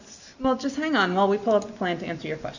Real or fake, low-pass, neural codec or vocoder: real; 7.2 kHz; none